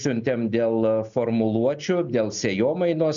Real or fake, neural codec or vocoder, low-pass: real; none; 7.2 kHz